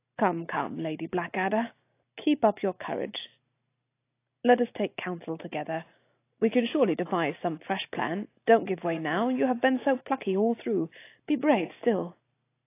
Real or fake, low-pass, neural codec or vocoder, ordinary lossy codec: real; 3.6 kHz; none; AAC, 24 kbps